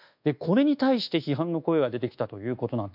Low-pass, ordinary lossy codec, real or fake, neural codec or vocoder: 5.4 kHz; none; fake; codec, 24 kHz, 1.2 kbps, DualCodec